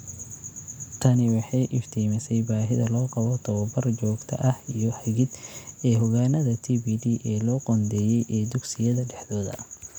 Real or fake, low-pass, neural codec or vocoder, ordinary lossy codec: real; 19.8 kHz; none; none